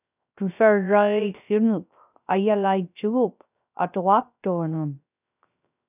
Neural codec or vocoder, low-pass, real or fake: codec, 16 kHz, 0.3 kbps, FocalCodec; 3.6 kHz; fake